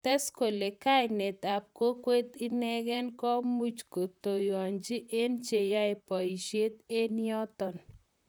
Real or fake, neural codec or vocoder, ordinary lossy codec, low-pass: fake; vocoder, 44.1 kHz, 128 mel bands, Pupu-Vocoder; none; none